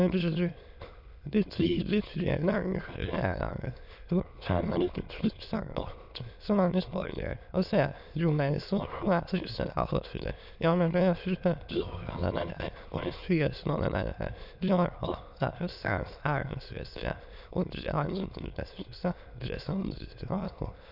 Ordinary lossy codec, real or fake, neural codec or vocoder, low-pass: none; fake; autoencoder, 22.05 kHz, a latent of 192 numbers a frame, VITS, trained on many speakers; 5.4 kHz